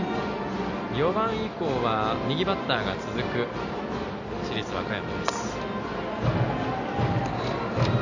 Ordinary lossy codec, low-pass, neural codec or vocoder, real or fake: none; 7.2 kHz; none; real